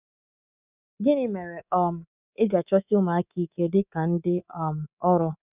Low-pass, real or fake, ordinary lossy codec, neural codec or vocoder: 3.6 kHz; fake; none; codec, 24 kHz, 3.1 kbps, DualCodec